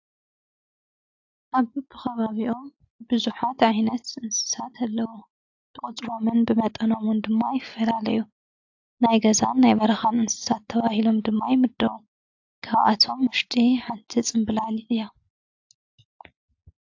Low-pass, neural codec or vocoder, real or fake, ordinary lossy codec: 7.2 kHz; none; real; AAC, 48 kbps